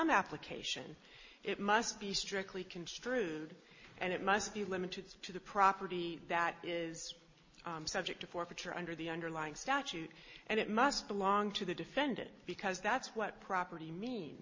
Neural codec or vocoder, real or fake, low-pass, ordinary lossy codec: none; real; 7.2 kHz; MP3, 32 kbps